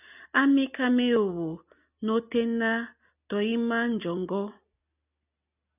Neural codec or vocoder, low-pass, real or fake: none; 3.6 kHz; real